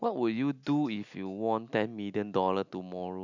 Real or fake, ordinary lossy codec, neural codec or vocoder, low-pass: real; none; none; 7.2 kHz